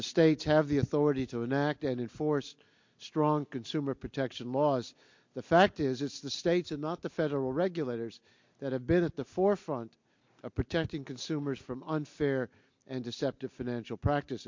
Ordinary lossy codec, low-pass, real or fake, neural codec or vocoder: MP3, 48 kbps; 7.2 kHz; real; none